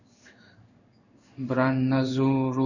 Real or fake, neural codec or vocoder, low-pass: fake; codec, 16 kHz in and 24 kHz out, 1 kbps, XY-Tokenizer; 7.2 kHz